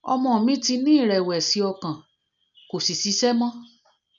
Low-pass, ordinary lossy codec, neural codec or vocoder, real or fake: 7.2 kHz; none; none; real